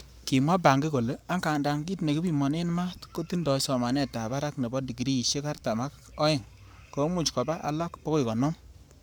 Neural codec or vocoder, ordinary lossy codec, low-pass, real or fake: codec, 44.1 kHz, 7.8 kbps, Pupu-Codec; none; none; fake